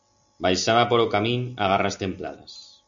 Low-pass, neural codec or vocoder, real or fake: 7.2 kHz; none; real